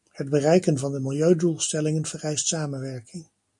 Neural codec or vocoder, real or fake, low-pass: none; real; 10.8 kHz